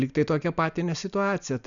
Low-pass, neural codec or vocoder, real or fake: 7.2 kHz; none; real